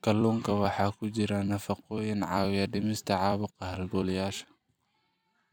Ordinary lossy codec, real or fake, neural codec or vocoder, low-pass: none; fake; vocoder, 44.1 kHz, 128 mel bands every 256 samples, BigVGAN v2; none